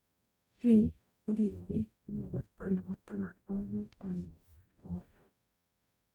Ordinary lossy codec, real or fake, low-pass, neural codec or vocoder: none; fake; 19.8 kHz; codec, 44.1 kHz, 0.9 kbps, DAC